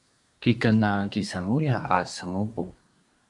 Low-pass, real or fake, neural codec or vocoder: 10.8 kHz; fake; codec, 24 kHz, 1 kbps, SNAC